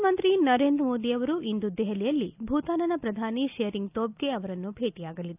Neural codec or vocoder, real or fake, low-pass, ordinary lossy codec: none; real; 3.6 kHz; none